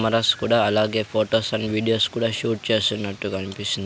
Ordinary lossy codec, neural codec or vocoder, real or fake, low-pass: none; none; real; none